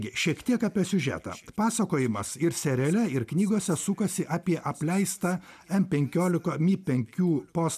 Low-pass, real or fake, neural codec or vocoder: 14.4 kHz; real; none